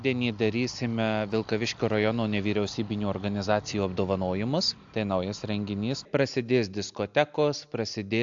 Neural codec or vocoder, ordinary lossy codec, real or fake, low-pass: none; AAC, 64 kbps; real; 7.2 kHz